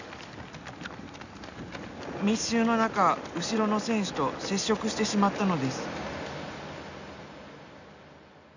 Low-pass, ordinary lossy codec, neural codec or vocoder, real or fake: 7.2 kHz; none; none; real